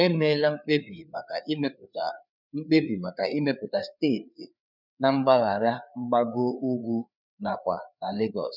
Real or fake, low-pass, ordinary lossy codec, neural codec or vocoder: fake; 5.4 kHz; none; codec, 16 kHz, 4 kbps, FreqCodec, larger model